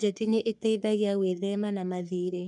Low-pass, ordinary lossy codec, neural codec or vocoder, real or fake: 10.8 kHz; none; codec, 44.1 kHz, 3.4 kbps, Pupu-Codec; fake